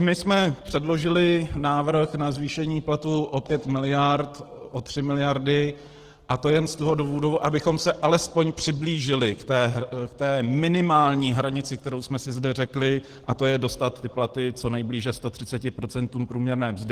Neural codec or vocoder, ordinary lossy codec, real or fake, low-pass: codec, 44.1 kHz, 7.8 kbps, DAC; Opus, 16 kbps; fake; 14.4 kHz